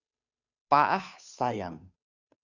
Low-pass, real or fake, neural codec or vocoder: 7.2 kHz; fake; codec, 16 kHz, 2 kbps, FunCodec, trained on Chinese and English, 25 frames a second